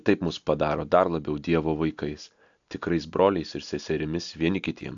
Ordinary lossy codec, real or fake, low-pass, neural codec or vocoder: AAC, 64 kbps; real; 7.2 kHz; none